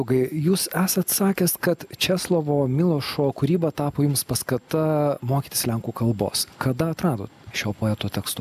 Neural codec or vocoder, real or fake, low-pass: none; real; 14.4 kHz